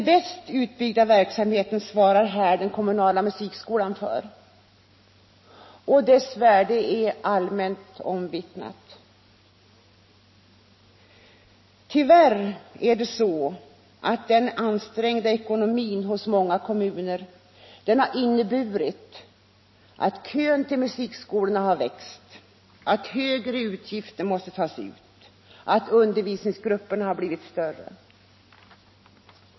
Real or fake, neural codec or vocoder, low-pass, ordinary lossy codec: real; none; 7.2 kHz; MP3, 24 kbps